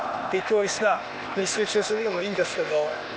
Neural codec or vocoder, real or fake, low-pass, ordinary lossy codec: codec, 16 kHz, 0.8 kbps, ZipCodec; fake; none; none